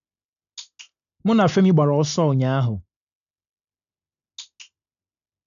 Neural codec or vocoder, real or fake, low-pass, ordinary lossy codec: none; real; 7.2 kHz; none